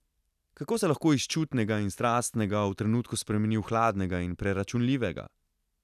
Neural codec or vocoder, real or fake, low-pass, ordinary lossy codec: none; real; 14.4 kHz; none